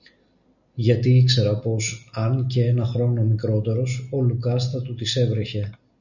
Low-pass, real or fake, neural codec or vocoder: 7.2 kHz; real; none